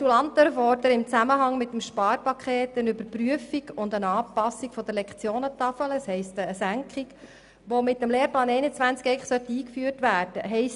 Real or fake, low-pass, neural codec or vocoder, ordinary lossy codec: real; 10.8 kHz; none; none